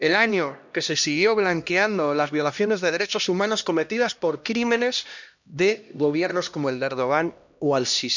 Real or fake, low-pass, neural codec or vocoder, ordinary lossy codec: fake; 7.2 kHz; codec, 16 kHz, 1 kbps, X-Codec, HuBERT features, trained on LibriSpeech; none